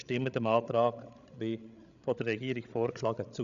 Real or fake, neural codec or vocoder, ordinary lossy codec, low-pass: fake; codec, 16 kHz, 16 kbps, FreqCodec, larger model; none; 7.2 kHz